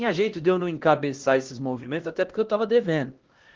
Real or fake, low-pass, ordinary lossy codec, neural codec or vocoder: fake; 7.2 kHz; Opus, 16 kbps; codec, 16 kHz, 1 kbps, X-Codec, HuBERT features, trained on LibriSpeech